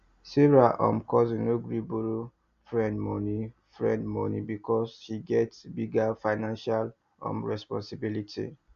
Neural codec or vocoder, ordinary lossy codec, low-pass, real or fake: none; Opus, 64 kbps; 7.2 kHz; real